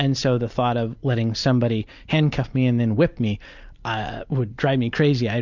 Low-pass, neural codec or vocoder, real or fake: 7.2 kHz; none; real